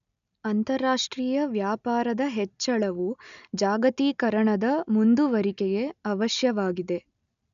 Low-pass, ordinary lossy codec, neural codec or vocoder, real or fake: 7.2 kHz; none; none; real